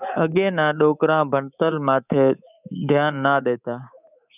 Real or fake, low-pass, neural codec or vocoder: fake; 3.6 kHz; codec, 24 kHz, 3.1 kbps, DualCodec